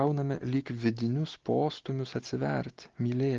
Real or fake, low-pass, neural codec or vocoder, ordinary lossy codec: real; 7.2 kHz; none; Opus, 16 kbps